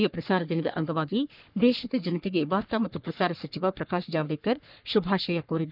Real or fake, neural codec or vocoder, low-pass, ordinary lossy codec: fake; codec, 44.1 kHz, 3.4 kbps, Pupu-Codec; 5.4 kHz; none